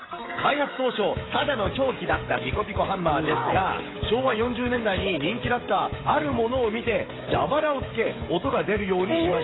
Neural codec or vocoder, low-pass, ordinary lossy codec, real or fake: codec, 16 kHz, 16 kbps, FreqCodec, smaller model; 7.2 kHz; AAC, 16 kbps; fake